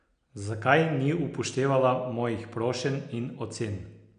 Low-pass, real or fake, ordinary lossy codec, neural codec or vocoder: 9.9 kHz; real; none; none